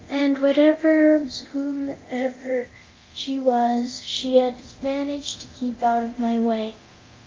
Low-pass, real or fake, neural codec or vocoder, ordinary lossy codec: 7.2 kHz; fake; codec, 24 kHz, 0.9 kbps, DualCodec; Opus, 24 kbps